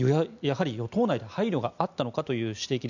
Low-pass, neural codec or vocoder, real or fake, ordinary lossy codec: 7.2 kHz; none; real; none